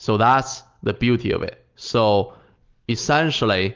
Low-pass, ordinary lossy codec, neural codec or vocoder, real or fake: 7.2 kHz; Opus, 32 kbps; none; real